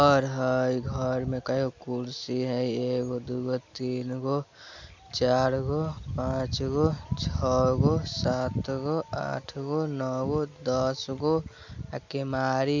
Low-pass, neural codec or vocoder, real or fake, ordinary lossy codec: 7.2 kHz; none; real; MP3, 64 kbps